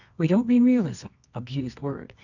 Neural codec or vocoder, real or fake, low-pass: codec, 24 kHz, 0.9 kbps, WavTokenizer, medium music audio release; fake; 7.2 kHz